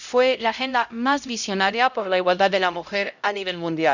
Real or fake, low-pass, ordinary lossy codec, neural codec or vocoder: fake; 7.2 kHz; none; codec, 16 kHz, 0.5 kbps, X-Codec, HuBERT features, trained on LibriSpeech